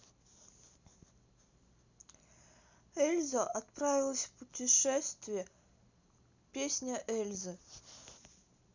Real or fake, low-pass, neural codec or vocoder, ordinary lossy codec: real; 7.2 kHz; none; none